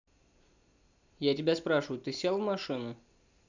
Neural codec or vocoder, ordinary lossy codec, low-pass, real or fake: none; none; 7.2 kHz; real